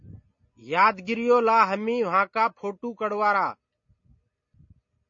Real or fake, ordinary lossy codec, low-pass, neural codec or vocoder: real; MP3, 32 kbps; 7.2 kHz; none